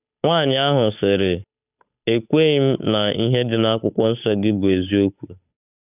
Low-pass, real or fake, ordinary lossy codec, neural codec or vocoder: 3.6 kHz; fake; none; codec, 16 kHz, 8 kbps, FunCodec, trained on Chinese and English, 25 frames a second